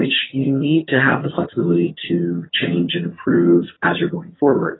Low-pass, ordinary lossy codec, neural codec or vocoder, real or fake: 7.2 kHz; AAC, 16 kbps; vocoder, 22.05 kHz, 80 mel bands, HiFi-GAN; fake